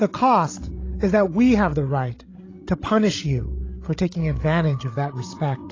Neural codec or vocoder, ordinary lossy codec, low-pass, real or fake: codec, 16 kHz, 16 kbps, FreqCodec, larger model; AAC, 32 kbps; 7.2 kHz; fake